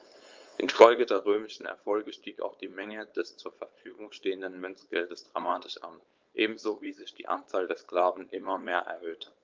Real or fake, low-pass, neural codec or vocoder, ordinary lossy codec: fake; 7.2 kHz; codec, 16 kHz, 4.8 kbps, FACodec; Opus, 32 kbps